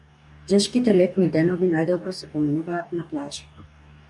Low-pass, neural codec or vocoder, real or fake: 10.8 kHz; codec, 44.1 kHz, 2.6 kbps, DAC; fake